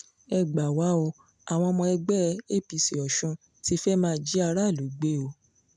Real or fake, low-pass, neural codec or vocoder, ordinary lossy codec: real; 9.9 kHz; none; none